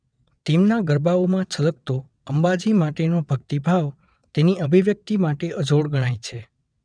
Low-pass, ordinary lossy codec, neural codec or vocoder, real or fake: 9.9 kHz; none; vocoder, 22.05 kHz, 80 mel bands, WaveNeXt; fake